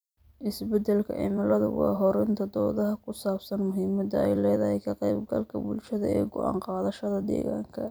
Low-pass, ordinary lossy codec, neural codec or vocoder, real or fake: none; none; vocoder, 44.1 kHz, 128 mel bands every 256 samples, BigVGAN v2; fake